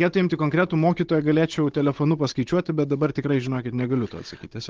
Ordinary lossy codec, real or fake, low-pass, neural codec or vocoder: Opus, 16 kbps; fake; 7.2 kHz; codec, 16 kHz, 16 kbps, FunCodec, trained on Chinese and English, 50 frames a second